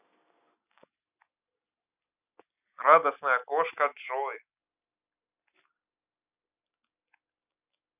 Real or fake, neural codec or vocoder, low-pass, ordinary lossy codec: real; none; 3.6 kHz; none